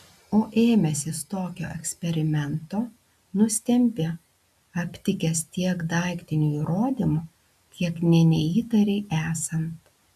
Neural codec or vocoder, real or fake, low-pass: none; real; 14.4 kHz